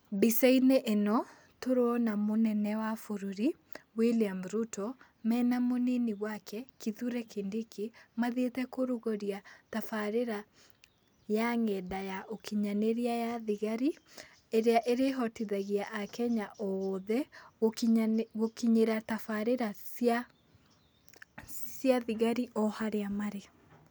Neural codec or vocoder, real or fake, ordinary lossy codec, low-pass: none; real; none; none